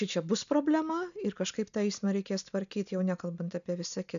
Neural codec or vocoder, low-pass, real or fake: none; 7.2 kHz; real